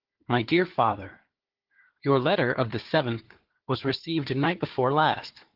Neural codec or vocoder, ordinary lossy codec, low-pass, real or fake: codec, 16 kHz, 4 kbps, FunCodec, trained on Chinese and English, 50 frames a second; Opus, 16 kbps; 5.4 kHz; fake